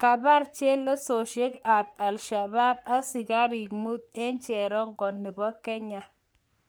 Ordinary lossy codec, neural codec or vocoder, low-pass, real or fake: none; codec, 44.1 kHz, 3.4 kbps, Pupu-Codec; none; fake